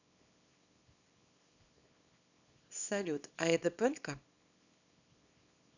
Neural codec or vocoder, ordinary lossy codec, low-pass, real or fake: codec, 24 kHz, 0.9 kbps, WavTokenizer, small release; none; 7.2 kHz; fake